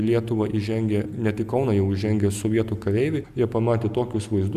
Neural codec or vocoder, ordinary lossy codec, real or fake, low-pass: none; AAC, 96 kbps; real; 14.4 kHz